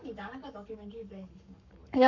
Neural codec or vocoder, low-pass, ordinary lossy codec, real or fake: codec, 44.1 kHz, 7.8 kbps, Pupu-Codec; 7.2 kHz; none; fake